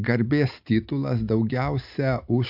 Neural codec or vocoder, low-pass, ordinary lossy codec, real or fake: none; 5.4 kHz; AAC, 48 kbps; real